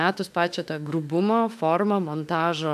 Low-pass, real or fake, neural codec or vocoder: 14.4 kHz; fake; autoencoder, 48 kHz, 32 numbers a frame, DAC-VAE, trained on Japanese speech